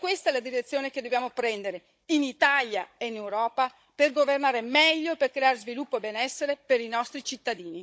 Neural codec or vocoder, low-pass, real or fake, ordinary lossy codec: codec, 16 kHz, 16 kbps, FunCodec, trained on Chinese and English, 50 frames a second; none; fake; none